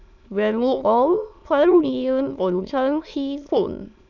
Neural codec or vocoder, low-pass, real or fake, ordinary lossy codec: autoencoder, 22.05 kHz, a latent of 192 numbers a frame, VITS, trained on many speakers; 7.2 kHz; fake; none